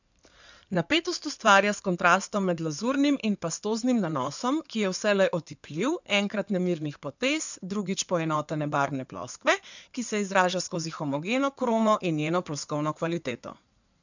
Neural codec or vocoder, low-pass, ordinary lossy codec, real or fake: codec, 16 kHz in and 24 kHz out, 2.2 kbps, FireRedTTS-2 codec; 7.2 kHz; none; fake